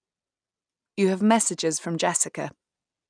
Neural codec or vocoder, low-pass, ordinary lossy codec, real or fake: none; 9.9 kHz; none; real